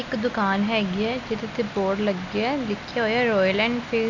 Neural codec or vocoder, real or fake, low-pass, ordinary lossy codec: none; real; 7.2 kHz; MP3, 64 kbps